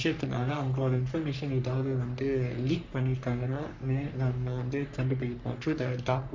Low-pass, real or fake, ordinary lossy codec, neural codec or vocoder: 7.2 kHz; fake; MP3, 64 kbps; codec, 44.1 kHz, 3.4 kbps, Pupu-Codec